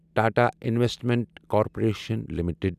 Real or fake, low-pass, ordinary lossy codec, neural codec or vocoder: real; 14.4 kHz; none; none